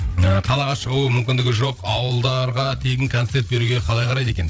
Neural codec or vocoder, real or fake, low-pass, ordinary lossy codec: codec, 16 kHz, 8 kbps, FreqCodec, larger model; fake; none; none